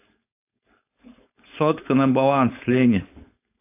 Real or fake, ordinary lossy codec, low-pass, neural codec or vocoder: fake; AAC, 24 kbps; 3.6 kHz; codec, 16 kHz, 4.8 kbps, FACodec